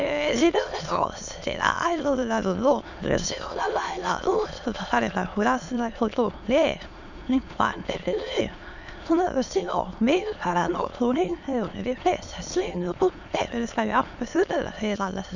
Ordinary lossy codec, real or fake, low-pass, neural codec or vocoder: none; fake; 7.2 kHz; autoencoder, 22.05 kHz, a latent of 192 numbers a frame, VITS, trained on many speakers